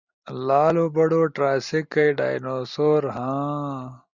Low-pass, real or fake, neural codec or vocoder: 7.2 kHz; real; none